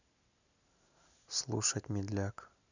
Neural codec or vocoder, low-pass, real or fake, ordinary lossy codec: none; 7.2 kHz; real; none